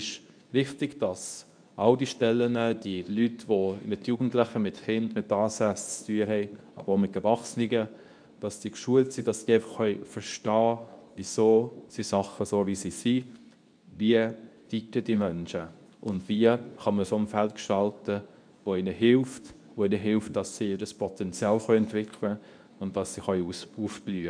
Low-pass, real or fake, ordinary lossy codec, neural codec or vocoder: 9.9 kHz; fake; none; codec, 24 kHz, 0.9 kbps, WavTokenizer, medium speech release version 2